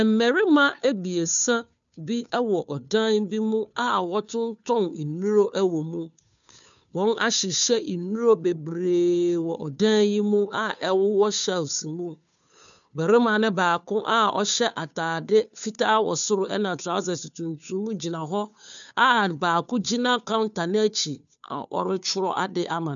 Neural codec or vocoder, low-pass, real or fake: codec, 16 kHz, 2 kbps, FunCodec, trained on Chinese and English, 25 frames a second; 7.2 kHz; fake